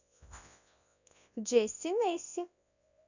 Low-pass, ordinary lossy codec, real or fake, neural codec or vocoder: 7.2 kHz; none; fake; codec, 24 kHz, 0.9 kbps, WavTokenizer, large speech release